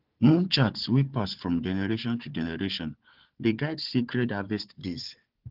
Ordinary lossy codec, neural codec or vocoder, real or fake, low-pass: Opus, 16 kbps; codec, 16 kHz, 4 kbps, FunCodec, trained on Chinese and English, 50 frames a second; fake; 5.4 kHz